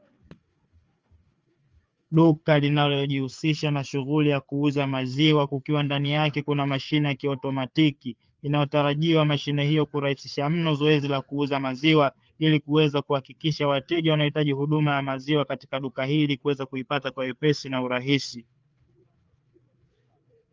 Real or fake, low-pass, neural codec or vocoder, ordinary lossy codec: fake; 7.2 kHz; codec, 16 kHz, 4 kbps, FreqCodec, larger model; Opus, 24 kbps